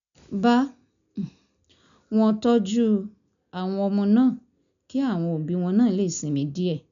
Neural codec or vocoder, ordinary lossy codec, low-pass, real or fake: none; none; 7.2 kHz; real